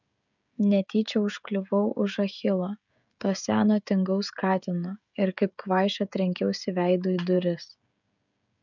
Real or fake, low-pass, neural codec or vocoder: fake; 7.2 kHz; codec, 16 kHz, 16 kbps, FreqCodec, smaller model